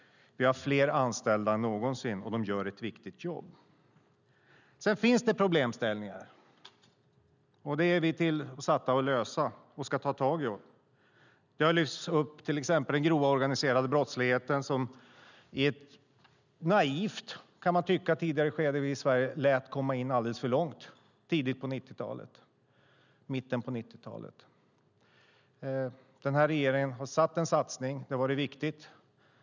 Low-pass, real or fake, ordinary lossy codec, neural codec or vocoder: 7.2 kHz; real; none; none